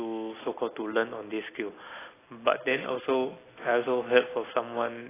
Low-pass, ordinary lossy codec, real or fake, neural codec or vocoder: 3.6 kHz; AAC, 16 kbps; real; none